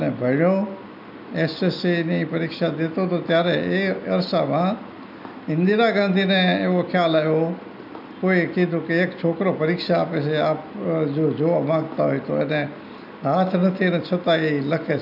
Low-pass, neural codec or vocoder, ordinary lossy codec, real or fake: 5.4 kHz; none; none; real